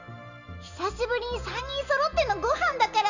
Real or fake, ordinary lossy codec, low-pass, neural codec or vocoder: real; Opus, 64 kbps; 7.2 kHz; none